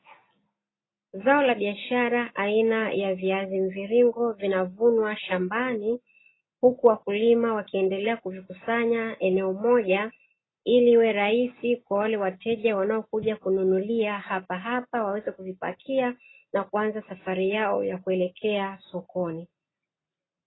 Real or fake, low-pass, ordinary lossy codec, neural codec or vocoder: real; 7.2 kHz; AAC, 16 kbps; none